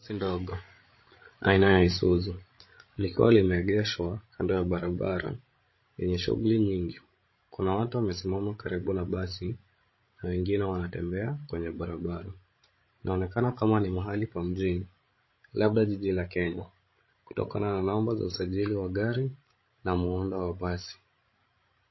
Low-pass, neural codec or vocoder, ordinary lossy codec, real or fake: 7.2 kHz; codec, 16 kHz, 16 kbps, FreqCodec, larger model; MP3, 24 kbps; fake